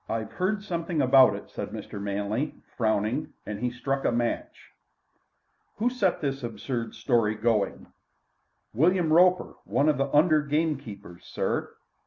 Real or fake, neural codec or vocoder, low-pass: real; none; 7.2 kHz